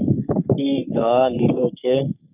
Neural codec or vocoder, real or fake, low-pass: codec, 44.1 kHz, 3.4 kbps, Pupu-Codec; fake; 3.6 kHz